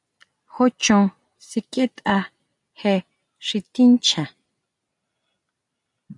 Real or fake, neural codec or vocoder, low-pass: real; none; 10.8 kHz